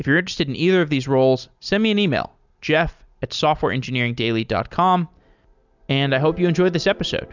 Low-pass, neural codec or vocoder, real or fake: 7.2 kHz; none; real